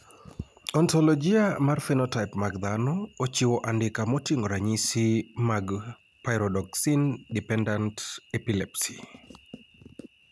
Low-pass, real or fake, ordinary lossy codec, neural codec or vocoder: none; real; none; none